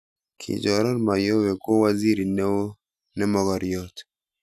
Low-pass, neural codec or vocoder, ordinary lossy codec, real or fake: 14.4 kHz; none; none; real